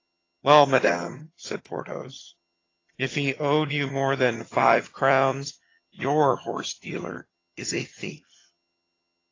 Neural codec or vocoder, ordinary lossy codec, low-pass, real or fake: vocoder, 22.05 kHz, 80 mel bands, HiFi-GAN; AAC, 32 kbps; 7.2 kHz; fake